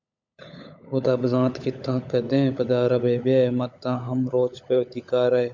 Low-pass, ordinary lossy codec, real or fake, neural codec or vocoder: 7.2 kHz; MP3, 64 kbps; fake; codec, 16 kHz, 16 kbps, FunCodec, trained on LibriTTS, 50 frames a second